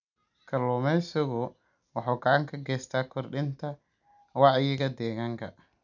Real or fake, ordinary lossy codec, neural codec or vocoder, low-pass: real; none; none; 7.2 kHz